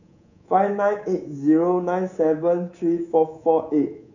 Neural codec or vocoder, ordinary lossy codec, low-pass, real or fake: codec, 24 kHz, 3.1 kbps, DualCodec; none; 7.2 kHz; fake